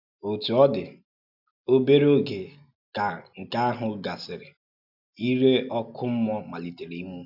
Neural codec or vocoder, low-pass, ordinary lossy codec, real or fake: none; 5.4 kHz; none; real